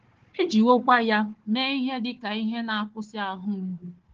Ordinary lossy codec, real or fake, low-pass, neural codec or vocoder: Opus, 16 kbps; fake; 7.2 kHz; codec, 16 kHz, 4 kbps, FunCodec, trained on Chinese and English, 50 frames a second